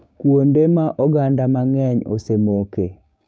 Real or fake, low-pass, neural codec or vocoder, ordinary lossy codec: fake; none; codec, 16 kHz, 6 kbps, DAC; none